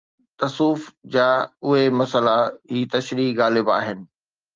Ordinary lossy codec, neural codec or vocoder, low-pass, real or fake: Opus, 32 kbps; none; 7.2 kHz; real